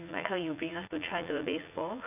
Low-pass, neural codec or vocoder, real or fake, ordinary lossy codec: 3.6 kHz; vocoder, 44.1 kHz, 80 mel bands, Vocos; fake; none